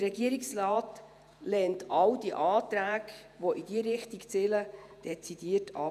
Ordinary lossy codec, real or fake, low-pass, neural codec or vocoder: none; fake; 14.4 kHz; vocoder, 48 kHz, 128 mel bands, Vocos